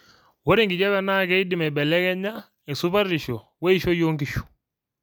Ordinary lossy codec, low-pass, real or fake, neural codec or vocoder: none; none; real; none